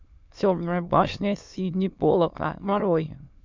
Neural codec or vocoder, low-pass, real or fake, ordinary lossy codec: autoencoder, 22.05 kHz, a latent of 192 numbers a frame, VITS, trained on many speakers; 7.2 kHz; fake; MP3, 64 kbps